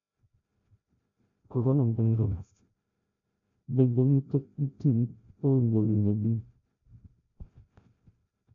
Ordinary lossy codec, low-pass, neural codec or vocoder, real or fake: none; 7.2 kHz; codec, 16 kHz, 0.5 kbps, FreqCodec, larger model; fake